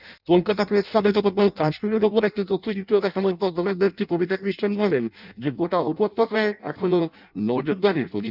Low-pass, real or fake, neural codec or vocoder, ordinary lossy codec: 5.4 kHz; fake; codec, 16 kHz in and 24 kHz out, 0.6 kbps, FireRedTTS-2 codec; none